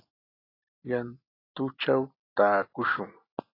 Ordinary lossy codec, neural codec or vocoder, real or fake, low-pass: AAC, 24 kbps; none; real; 5.4 kHz